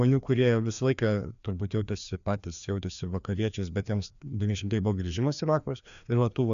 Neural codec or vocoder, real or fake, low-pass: codec, 16 kHz, 2 kbps, FreqCodec, larger model; fake; 7.2 kHz